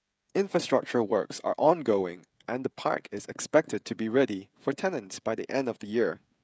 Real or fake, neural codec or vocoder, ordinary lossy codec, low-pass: fake; codec, 16 kHz, 16 kbps, FreqCodec, smaller model; none; none